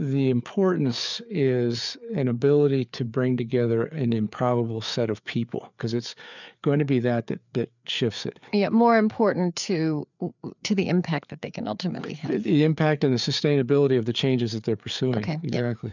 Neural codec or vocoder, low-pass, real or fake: codec, 16 kHz, 4 kbps, FreqCodec, larger model; 7.2 kHz; fake